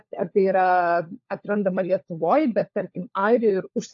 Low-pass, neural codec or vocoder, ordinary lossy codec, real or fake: 7.2 kHz; codec, 16 kHz, 4 kbps, FunCodec, trained on LibriTTS, 50 frames a second; AAC, 48 kbps; fake